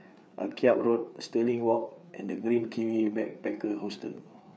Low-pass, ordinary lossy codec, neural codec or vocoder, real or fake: none; none; codec, 16 kHz, 4 kbps, FreqCodec, larger model; fake